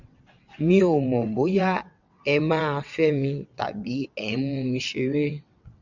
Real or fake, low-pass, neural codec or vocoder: fake; 7.2 kHz; vocoder, 22.05 kHz, 80 mel bands, WaveNeXt